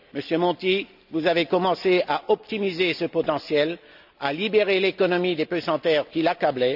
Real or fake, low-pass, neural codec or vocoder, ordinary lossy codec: real; 5.4 kHz; none; none